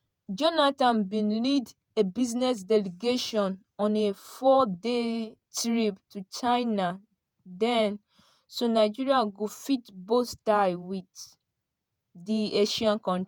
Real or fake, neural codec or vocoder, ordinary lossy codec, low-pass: fake; vocoder, 48 kHz, 128 mel bands, Vocos; none; none